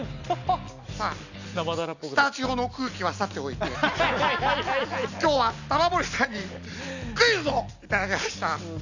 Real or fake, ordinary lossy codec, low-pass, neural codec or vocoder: real; none; 7.2 kHz; none